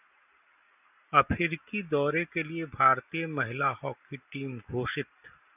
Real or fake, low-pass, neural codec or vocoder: real; 3.6 kHz; none